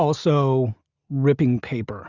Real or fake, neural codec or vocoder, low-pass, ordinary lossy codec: real; none; 7.2 kHz; Opus, 64 kbps